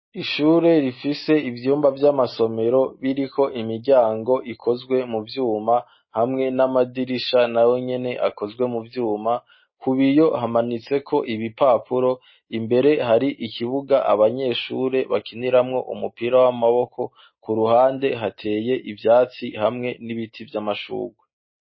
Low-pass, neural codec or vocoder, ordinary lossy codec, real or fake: 7.2 kHz; none; MP3, 24 kbps; real